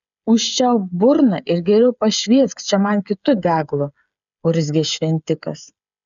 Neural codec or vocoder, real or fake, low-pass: codec, 16 kHz, 8 kbps, FreqCodec, smaller model; fake; 7.2 kHz